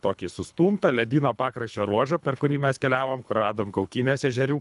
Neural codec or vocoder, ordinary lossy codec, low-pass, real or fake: codec, 24 kHz, 3 kbps, HILCodec; MP3, 96 kbps; 10.8 kHz; fake